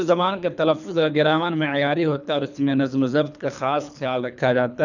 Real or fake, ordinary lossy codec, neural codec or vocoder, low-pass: fake; none; codec, 24 kHz, 3 kbps, HILCodec; 7.2 kHz